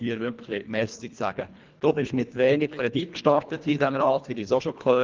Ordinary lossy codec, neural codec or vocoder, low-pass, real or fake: Opus, 24 kbps; codec, 24 kHz, 1.5 kbps, HILCodec; 7.2 kHz; fake